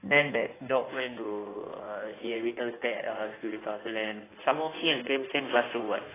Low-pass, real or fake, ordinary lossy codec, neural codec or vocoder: 3.6 kHz; fake; AAC, 16 kbps; codec, 16 kHz in and 24 kHz out, 1.1 kbps, FireRedTTS-2 codec